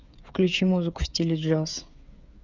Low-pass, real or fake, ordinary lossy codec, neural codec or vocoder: 7.2 kHz; fake; none; codec, 16 kHz, 8 kbps, FreqCodec, smaller model